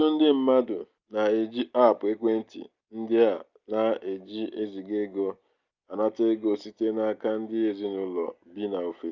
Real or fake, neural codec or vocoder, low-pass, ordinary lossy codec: real; none; 7.2 kHz; Opus, 24 kbps